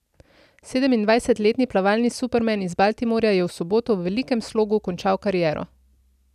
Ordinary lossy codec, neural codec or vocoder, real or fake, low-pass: none; none; real; 14.4 kHz